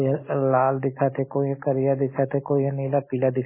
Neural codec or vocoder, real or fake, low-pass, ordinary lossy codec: none; real; 3.6 kHz; MP3, 16 kbps